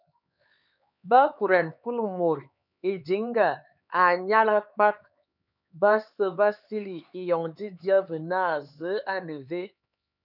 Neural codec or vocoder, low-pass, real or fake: codec, 16 kHz, 4 kbps, X-Codec, HuBERT features, trained on LibriSpeech; 5.4 kHz; fake